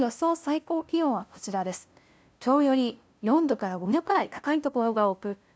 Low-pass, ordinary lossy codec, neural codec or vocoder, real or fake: none; none; codec, 16 kHz, 0.5 kbps, FunCodec, trained on LibriTTS, 25 frames a second; fake